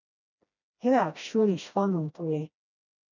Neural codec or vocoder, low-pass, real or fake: codec, 16 kHz, 1 kbps, FreqCodec, smaller model; 7.2 kHz; fake